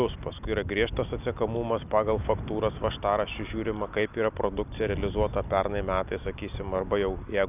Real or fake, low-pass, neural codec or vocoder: real; 3.6 kHz; none